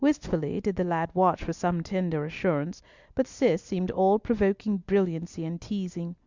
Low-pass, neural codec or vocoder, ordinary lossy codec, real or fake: 7.2 kHz; none; Opus, 64 kbps; real